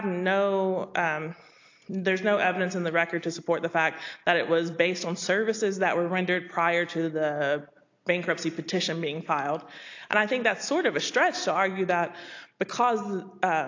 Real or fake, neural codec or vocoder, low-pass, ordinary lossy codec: real; none; 7.2 kHz; AAC, 48 kbps